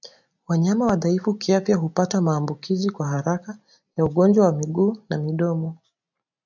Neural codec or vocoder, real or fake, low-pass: none; real; 7.2 kHz